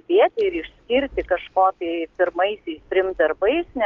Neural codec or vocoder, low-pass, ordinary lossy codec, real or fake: none; 7.2 kHz; Opus, 32 kbps; real